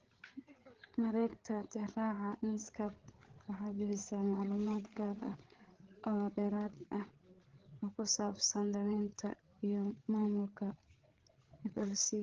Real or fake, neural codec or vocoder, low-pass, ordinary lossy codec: fake; codec, 16 kHz, 8 kbps, FreqCodec, larger model; 7.2 kHz; Opus, 16 kbps